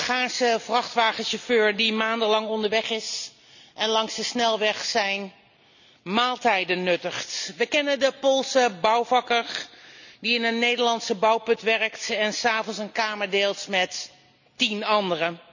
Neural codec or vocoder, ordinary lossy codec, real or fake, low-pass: none; none; real; 7.2 kHz